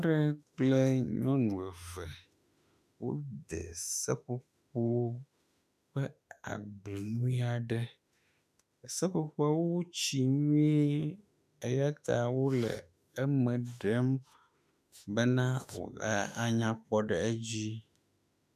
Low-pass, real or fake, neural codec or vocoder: 14.4 kHz; fake; autoencoder, 48 kHz, 32 numbers a frame, DAC-VAE, trained on Japanese speech